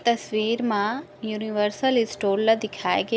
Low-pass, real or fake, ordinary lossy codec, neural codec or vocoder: none; real; none; none